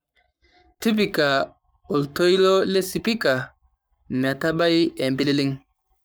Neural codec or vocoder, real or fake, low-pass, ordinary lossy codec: codec, 44.1 kHz, 7.8 kbps, Pupu-Codec; fake; none; none